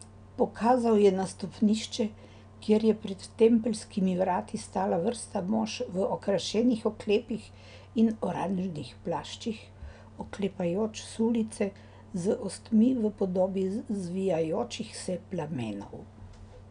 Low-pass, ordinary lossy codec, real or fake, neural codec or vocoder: 9.9 kHz; none; real; none